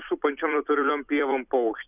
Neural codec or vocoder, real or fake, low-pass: vocoder, 44.1 kHz, 128 mel bands every 256 samples, BigVGAN v2; fake; 3.6 kHz